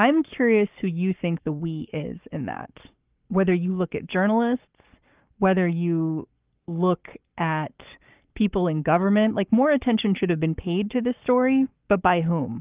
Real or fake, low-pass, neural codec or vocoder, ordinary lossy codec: fake; 3.6 kHz; codec, 44.1 kHz, 7.8 kbps, Pupu-Codec; Opus, 32 kbps